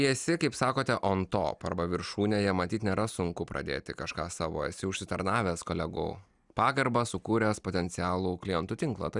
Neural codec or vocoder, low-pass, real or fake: none; 10.8 kHz; real